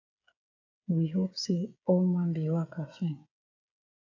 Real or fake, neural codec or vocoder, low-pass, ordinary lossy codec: fake; codec, 16 kHz, 8 kbps, FreqCodec, smaller model; 7.2 kHz; AAC, 48 kbps